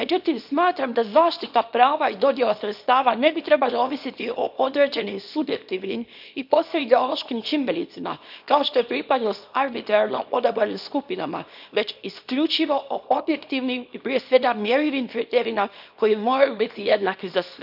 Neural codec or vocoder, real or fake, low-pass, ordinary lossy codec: codec, 24 kHz, 0.9 kbps, WavTokenizer, small release; fake; 5.4 kHz; none